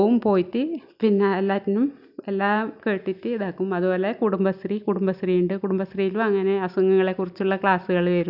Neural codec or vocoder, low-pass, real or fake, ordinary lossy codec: none; 5.4 kHz; real; none